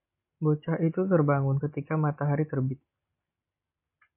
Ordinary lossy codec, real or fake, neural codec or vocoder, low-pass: MP3, 24 kbps; real; none; 3.6 kHz